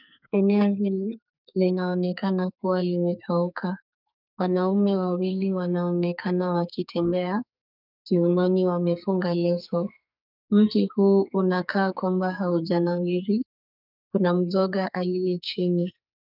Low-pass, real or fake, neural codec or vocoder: 5.4 kHz; fake; codec, 44.1 kHz, 2.6 kbps, SNAC